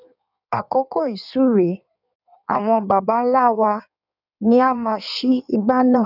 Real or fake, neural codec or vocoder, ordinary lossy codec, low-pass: fake; codec, 16 kHz in and 24 kHz out, 1.1 kbps, FireRedTTS-2 codec; none; 5.4 kHz